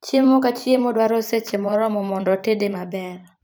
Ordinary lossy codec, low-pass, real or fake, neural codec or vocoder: none; none; fake; vocoder, 44.1 kHz, 128 mel bands every 512 samples, BigVGAN v2